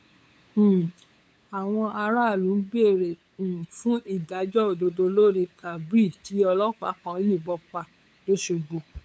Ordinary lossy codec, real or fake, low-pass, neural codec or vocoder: none; fake; none; codec, 16 kHz, 8 kbps, FunCodec, trained on LibriTTS, 25 frames a second